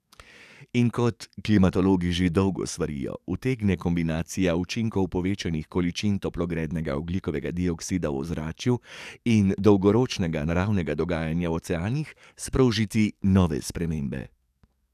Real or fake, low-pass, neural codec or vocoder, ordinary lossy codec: fake; 14.4 kHz; codec, 44.1 kHz, 7.8 kbps, DAC; none